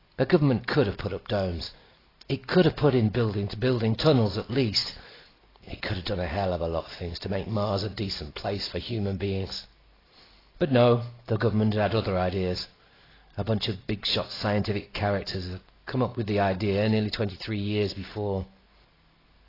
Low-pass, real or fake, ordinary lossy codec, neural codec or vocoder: 5.4 kHz; real; AAC, 24 kbps; none